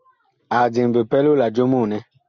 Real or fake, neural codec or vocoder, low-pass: real; none; 7.2 kHz